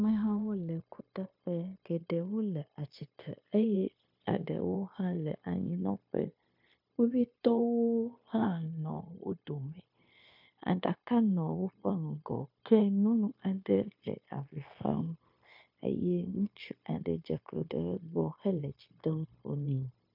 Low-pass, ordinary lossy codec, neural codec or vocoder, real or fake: 5.4 kHz; MP3, 48 kbps; codec, 16 kHz, 0.9 kbps, LongCat-Audio-Codec; fake